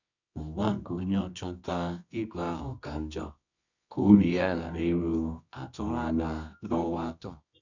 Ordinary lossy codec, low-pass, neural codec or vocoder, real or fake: none; 7.2 kHz; codec, 24 kHz, 0.9 kbps, WavTokenizer, medium music audio release; fake